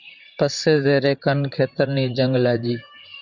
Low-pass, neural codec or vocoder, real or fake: 7.2 kHz; vocoder, 44.1 kHz, 128 mel bands, Pupu-Vocoder; fake